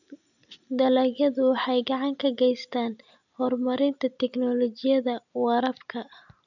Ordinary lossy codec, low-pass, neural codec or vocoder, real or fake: none; 7.2 kHz; none; real